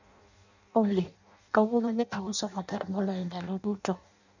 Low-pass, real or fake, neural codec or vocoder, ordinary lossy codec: 7.2 kHz; fake; codec, 16 kHz in and 24 kHz out, 0.6 kbps, FireRedTTS-2 codec; none